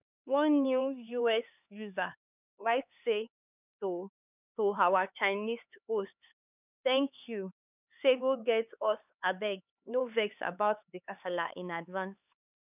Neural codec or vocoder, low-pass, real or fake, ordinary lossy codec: codec, 16 kHz, 4 kbps, X-Codec, HuBERT features, trained on LibriSpeech; 3.6 kHz; fake; none